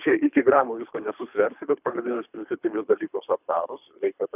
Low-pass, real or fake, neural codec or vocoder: 3.6 kHz; fake; codec, 24 kHz, 3 kbps, HILCodec